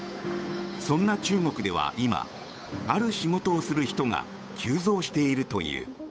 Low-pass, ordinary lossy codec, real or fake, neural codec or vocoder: none; none; fake; codec, 16 kHz, 8 kbps, FunCodec, trained on Chinese and English, 25 frames a second